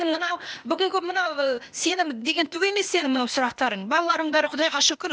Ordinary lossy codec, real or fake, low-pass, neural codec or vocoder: none; fake; none; codec, 16 kHz, 0.8 kbps, ZipCodec